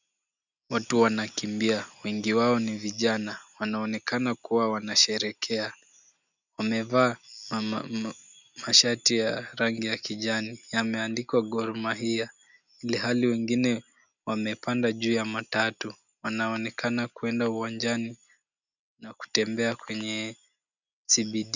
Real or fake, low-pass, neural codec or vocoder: real; 7.2 kHz; none